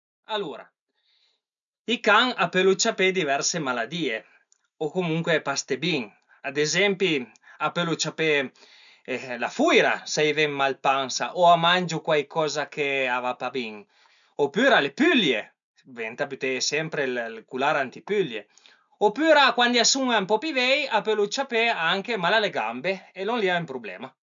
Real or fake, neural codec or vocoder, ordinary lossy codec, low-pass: real; none; MP3, 96 kbps; 7.2 kHz